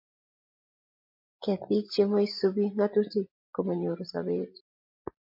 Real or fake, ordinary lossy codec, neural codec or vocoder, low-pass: real; MP3, 32 kbps; none; 5.4 kHz